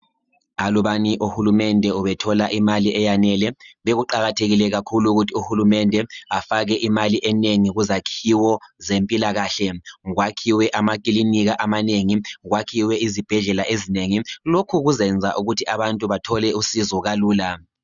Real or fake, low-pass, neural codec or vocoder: real; 7.2 kHz; none